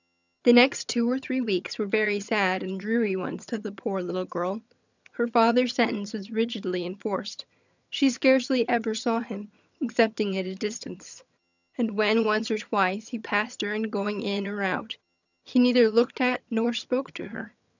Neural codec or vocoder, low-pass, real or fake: vocoder, 22.05 kHz, 80 mel bands, HiFi-GAN; 7.2 kHz; fake